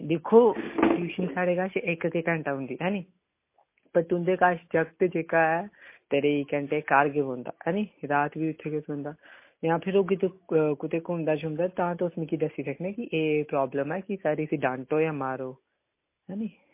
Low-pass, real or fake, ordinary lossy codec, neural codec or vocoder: 3.6 kHz; real; MP3, 24 kbps; none